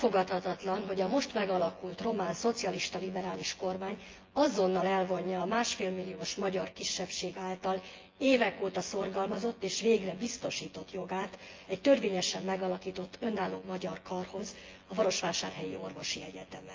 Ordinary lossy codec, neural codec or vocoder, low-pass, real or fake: Opus, 32 kbps; vocoder, 24 kHz, 100 mel bands, Vocos; 7.2 kHz; fake